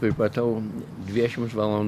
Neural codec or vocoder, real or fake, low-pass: none; real; 14.4 kHz